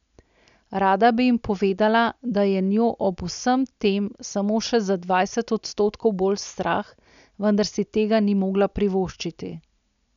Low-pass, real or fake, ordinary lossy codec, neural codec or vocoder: 7.2 kHz; real; none; none